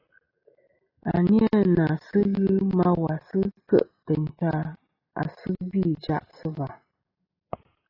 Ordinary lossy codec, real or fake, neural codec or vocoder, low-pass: AAC, 24 kbps; real; none; 5.4 kHz